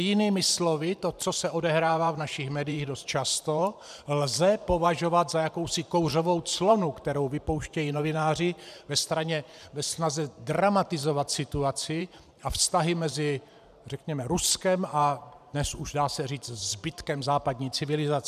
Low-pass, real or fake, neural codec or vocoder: 14.4 kHz; fake; vocoder, 44.1 kHz, 128 mel bands every 512 samples, BigVGAN v2